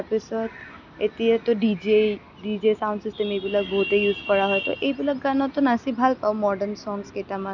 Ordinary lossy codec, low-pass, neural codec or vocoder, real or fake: none; 7.2 kHz; none; real